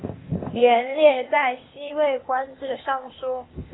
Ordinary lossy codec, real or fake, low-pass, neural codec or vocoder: AAC, 16 kbps; fake; 7.2 kHz; codec, 16 kHz, 0.8 kbps, ZipCodec